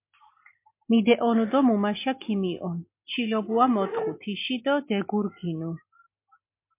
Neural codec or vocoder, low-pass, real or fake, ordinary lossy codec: none; 3.6 kHz; real; MP3, 24 kbps